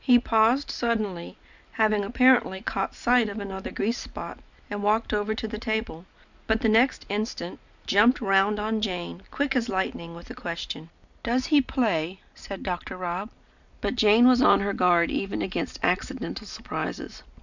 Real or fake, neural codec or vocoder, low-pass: real; none; 7.2 kHz